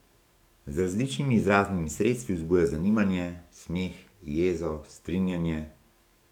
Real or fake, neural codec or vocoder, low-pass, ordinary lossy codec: fake; codec, 44.1 kHz, 7.8 kbps, DAC; 19.8 kHz; none